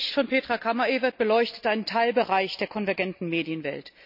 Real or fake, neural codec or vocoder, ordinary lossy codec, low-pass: real; none; none; 5.4 kHz